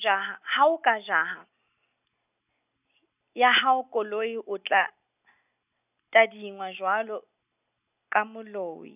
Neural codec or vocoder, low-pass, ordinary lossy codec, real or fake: none; 3.6 kHz; none; real